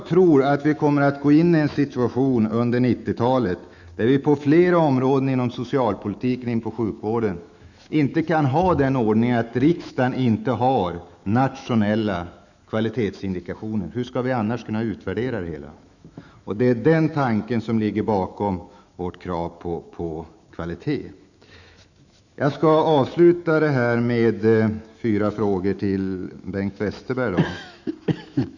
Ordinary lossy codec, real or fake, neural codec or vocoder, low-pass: none; fake; autoencoder, 48 kHz, 128 numbers a frame, DAC-VAE, trained on Japanese speech; 7.2 kHz